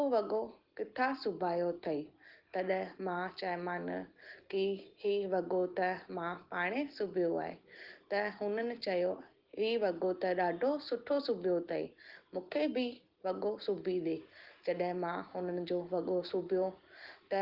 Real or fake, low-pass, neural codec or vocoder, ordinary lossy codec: real; 5.4 kHz; none; Opus, 16 kbps